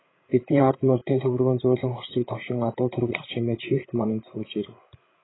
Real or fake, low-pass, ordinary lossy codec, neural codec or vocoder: fake; 7.2 kHz; AAC, 16 kbps; codec, 16 kHz, 8 kbps, FreqCodec, larger model